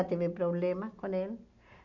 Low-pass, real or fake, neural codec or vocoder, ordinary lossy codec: 7.2 kHz; real; none; none